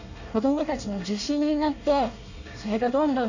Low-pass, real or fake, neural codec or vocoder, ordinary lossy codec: 7.2 kHz; fake; codec, 24 kHz, 1 kbps, SNAC; none